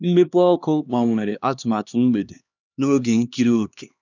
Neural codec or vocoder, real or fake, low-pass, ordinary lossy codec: codec, 16 kHz, 2 kbps, X-Codec, HuBERT features, trained on LibriSpeech; fake; 7.2 kHz; none